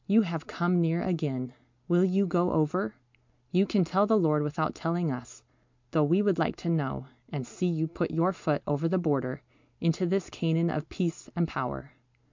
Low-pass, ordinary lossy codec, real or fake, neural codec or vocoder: 7.2 kHz; MP3, 64 kbps; real; none